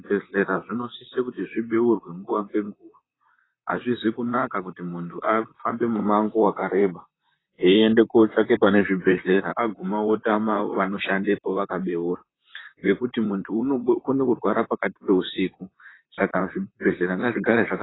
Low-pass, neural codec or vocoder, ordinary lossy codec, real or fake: 7.2 kHz; vocoder, 44.1 kHz, 128 mel bands, Pupu-Vocoder; AAC, 16 kbps; fake